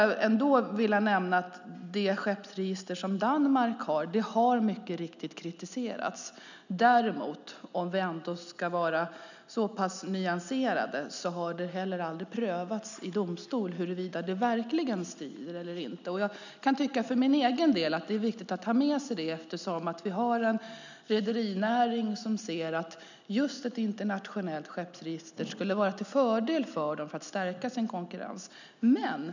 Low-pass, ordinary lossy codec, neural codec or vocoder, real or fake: 7.2 kHz; none; none; real